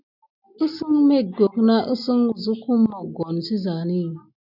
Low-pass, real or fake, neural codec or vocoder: 5.4 kHz; real; none